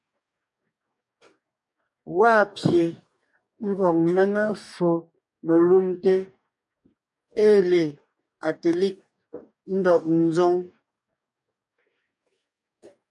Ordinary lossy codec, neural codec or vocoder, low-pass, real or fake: MP3, 96 kbps; codec, 44.1 kHz, 2.6 kbps, DAC; 10.8 kHz; fake